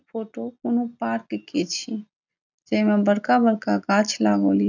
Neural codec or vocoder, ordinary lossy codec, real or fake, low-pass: none; none; real; 7.2 kHz